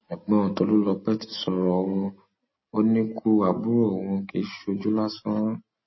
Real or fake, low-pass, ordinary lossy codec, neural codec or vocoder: real; 7.2 kHz; MP3, 24 kbps; none